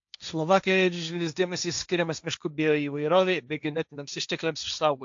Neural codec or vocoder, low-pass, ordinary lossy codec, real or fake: codec, 16 kHz, 1.1 kbps, Voila-Tokenizer; 7.2 kHz; AAC, 64 kbps; fake